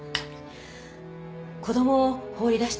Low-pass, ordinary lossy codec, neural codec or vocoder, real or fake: none; none; none; real